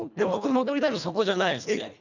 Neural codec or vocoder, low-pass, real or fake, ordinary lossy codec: codec, 24 kHz, 1.5 kbps, HILCodec; 7.2 kHz; fake; none